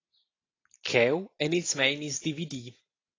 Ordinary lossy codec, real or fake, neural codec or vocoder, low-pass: AAC, 32 kbps; real; none; 7.2 kHz